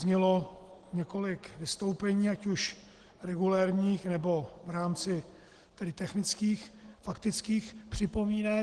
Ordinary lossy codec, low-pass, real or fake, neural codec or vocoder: Opus, 16 kbps; 9.9 kHz; real; none